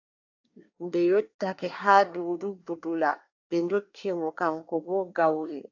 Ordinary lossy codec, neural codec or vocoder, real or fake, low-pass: AAC, 48 kbps; codec, 24 kHz, 1 kbps, SNAC; fake; 7.2 kHz